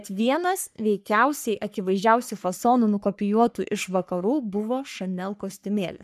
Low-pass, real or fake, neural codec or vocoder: 14.4 kHz; fake; codec, 44.1 kHz, 3.4 kbps, Pupu-Codec